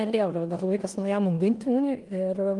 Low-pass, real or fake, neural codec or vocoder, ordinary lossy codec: 10.8 kHz; fake; codec, 16 kHz in and 24 kHz out, 0.9 kbps, LongCat-Audio-Codec, four codebook decoder; Opus, 24 kbps